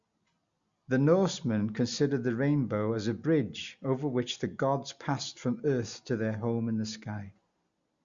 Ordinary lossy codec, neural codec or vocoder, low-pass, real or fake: Opus, 64 kbps; none; 7.2 kHz; real